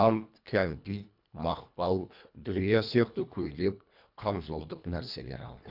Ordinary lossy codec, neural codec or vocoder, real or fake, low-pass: none; codec, 24 kHz, 1.5 kbps, HILCodec; fake; 5.4 kHz